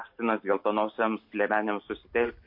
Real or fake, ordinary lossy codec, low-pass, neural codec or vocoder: real; MP3, 32 kbps; 5.4 kHz; none